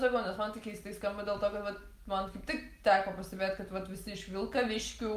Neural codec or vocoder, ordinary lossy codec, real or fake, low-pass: none; Opus, 32 kbps; real; 14.4 kHz